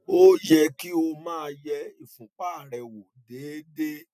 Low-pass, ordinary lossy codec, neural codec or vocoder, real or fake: 14.4 kHz; none; none; real